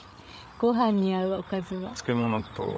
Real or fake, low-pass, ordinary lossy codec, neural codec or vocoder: fake; none; none; codec, 16 kHz, 8 kbps, FreqCodec, larger model